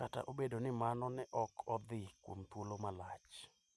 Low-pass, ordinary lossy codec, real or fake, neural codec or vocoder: none; none; real; none